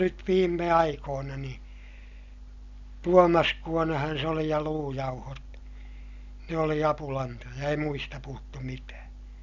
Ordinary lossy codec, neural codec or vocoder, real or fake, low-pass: none; none; real; 7.2 kHz